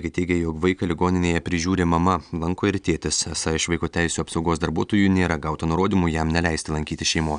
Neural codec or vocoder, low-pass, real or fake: none; 9.9 kHz; real